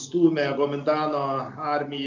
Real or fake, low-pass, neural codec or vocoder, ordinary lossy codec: real; 7.2 kHz; none; MP3, 48 kbps